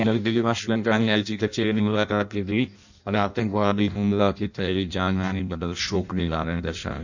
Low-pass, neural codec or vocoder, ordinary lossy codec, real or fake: 7.2 kHz; codec, 16 kHz in and 24 kHz out, 0.6 kbps, FireRedTTS-2 codec; none; fake